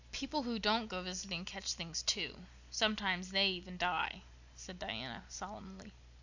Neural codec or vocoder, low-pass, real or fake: none; 7.2 kHz; real